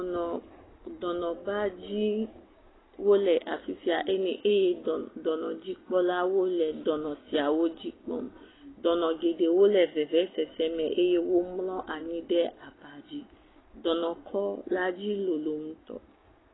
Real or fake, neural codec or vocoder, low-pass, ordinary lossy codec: real; none; 7.2 kHz; AAC, 16 kbps